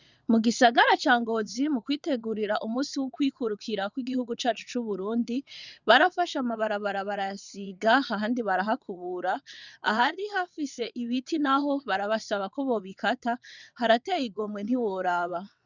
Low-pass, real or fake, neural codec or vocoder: 7.2 kHz; fake; vocoder, 22.05 kHz, 80 mel bands, WaveNeXt